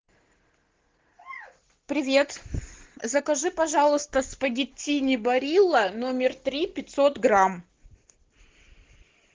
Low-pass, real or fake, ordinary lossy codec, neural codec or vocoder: 7.2 kHz; real; Opus, 16 kbps; none